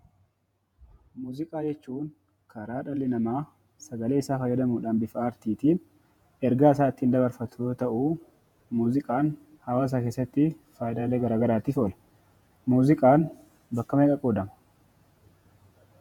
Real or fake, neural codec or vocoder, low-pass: fake; vocoder, 48 kHz, 128 mel bands, Vocos; 19.8 kHz